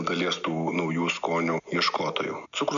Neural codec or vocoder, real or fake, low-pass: none; real; 7.2 kHz